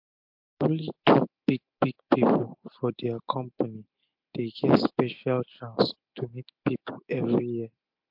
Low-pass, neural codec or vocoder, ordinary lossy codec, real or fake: 5.4 kHz; none; MP3, 48 kbps; real